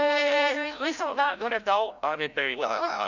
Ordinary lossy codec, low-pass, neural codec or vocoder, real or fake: none; 7.2 kHz; codec, 16 kHz, 0.5 kbps, FreqCodec, larger model; fake